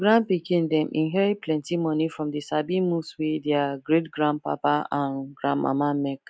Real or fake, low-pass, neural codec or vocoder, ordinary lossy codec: real; none; none; none